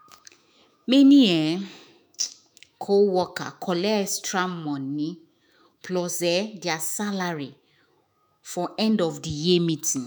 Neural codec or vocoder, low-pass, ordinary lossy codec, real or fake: autoencoder, 48 kHz, 128 numbers a frame, DAC-VAE, trained on Japanese speech; none; none; fake